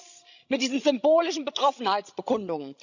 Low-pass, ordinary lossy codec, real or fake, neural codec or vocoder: 7.2 kHz; none; fake; codec, 16 kHz, 16 kbps, FreqCodec, larger model